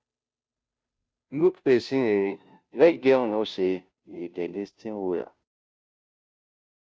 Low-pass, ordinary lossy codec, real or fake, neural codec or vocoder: none; none; fake; codec, 16 kHz, 0.5 kbps, FunCodec, trained on Chinese and English, 25 frames a second